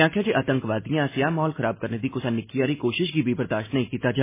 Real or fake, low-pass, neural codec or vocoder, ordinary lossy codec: real; 3.6 kHz; none; MP3, 16 kbps